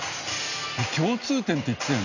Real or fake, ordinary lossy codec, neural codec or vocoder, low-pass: real; none; none; 7.2 kHz